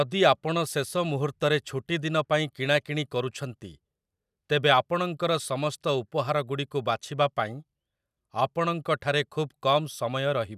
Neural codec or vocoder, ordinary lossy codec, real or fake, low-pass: none; none; real; 14.4 kHz